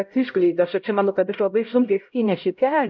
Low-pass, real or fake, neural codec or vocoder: 7.2 kHz; fake; codec, 16 kHz, 0.5 kbps, X-Codec, HuBERT features, trained on LibriSpeech